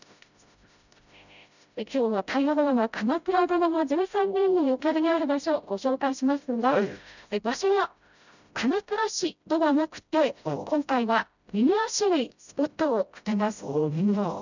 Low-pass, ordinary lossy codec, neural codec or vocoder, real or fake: 7.2 kHz; none; codec, 16 kHz, 0.5 kbps, FreqCodec, smaller model; fake